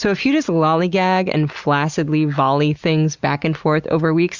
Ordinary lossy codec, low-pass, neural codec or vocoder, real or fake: Opus, 64 kbps; 7.2 kHz; none; real